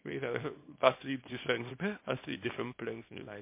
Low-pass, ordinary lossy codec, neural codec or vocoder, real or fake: 3.6 kHz; MP3, 24 kbps; codec, 24 kHz, 0.9 kbps, WavTokenizer, small release; fake